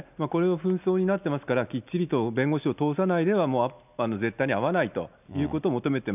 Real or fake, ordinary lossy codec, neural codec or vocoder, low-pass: real; none; none; 3.6 kHz